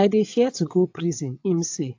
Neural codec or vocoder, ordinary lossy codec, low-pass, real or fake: vocoder, 22.05 kHz, 80 mel bands, WaveNeXt; AAC, 48 kbps; 7.2 kHz; fake